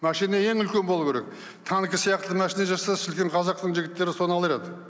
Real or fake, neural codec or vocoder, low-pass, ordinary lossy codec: real; none; none; none